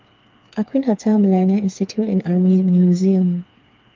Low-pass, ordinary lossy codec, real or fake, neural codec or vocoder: 7.2 kHz; Opus, 24 kbps; fake; codec, 16 kHz, 4 kbps, FreqCodec, smaller model